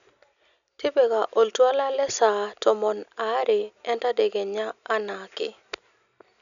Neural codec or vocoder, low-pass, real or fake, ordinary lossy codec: none; 7.2 kHz; real; none